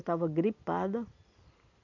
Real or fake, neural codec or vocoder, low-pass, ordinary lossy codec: real; none; 7.2 kHz; none